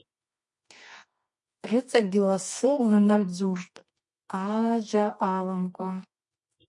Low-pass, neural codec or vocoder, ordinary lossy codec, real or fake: 10.8 kHz; codec, 24 kHz, 0.9 kbps, WavTokenizer, medium music audio release; MP3, 48 kbps; fake